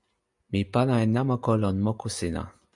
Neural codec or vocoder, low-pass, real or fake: none; 10.8 kHz; real